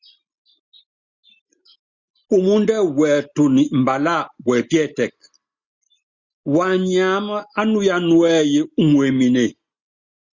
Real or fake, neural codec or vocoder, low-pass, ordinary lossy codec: real; none; 7.2 kHz; Opus, 64 kbps